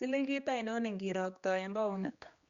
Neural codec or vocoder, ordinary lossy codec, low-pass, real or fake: codec, 16 kHz, 2 kbps, X-Codec, HuBERT features, trained on general audio; Opus, 64 kbps; 7.2 kHz; fake